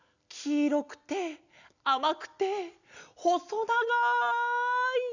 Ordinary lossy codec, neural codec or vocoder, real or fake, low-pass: none; none; real; 7.2 kHz